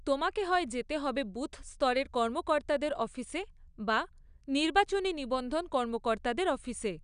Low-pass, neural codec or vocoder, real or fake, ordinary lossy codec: 9.9 kHz; none; real; none